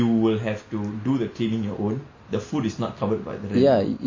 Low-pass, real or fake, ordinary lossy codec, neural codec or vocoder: 7.2 kHz; real; MP3, 32 kbps; none